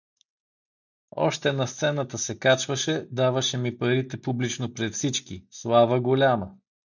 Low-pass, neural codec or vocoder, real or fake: 7.2 kHz; none; real